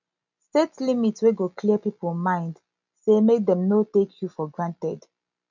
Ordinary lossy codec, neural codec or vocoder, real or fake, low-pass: none; none; real; 7.2 kHz